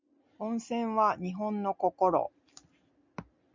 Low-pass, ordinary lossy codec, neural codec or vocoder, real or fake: 7.2 kHz; MP3, 64 kbps; none; real